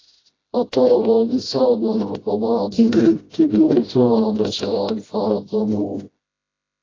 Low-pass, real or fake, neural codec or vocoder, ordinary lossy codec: 7.2 kHz; fake; codec, 16 kHz, 0.5 kbps, FreqCodec, smaller model; AAC, 32 kbps